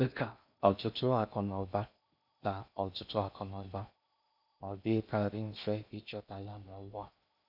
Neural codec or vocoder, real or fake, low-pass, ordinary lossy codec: codec, 16 kHz in and 24 kHz out, 0.6 kbps, FocalCodec, streaming, 4096 codes; fake; 5.4 kHz; none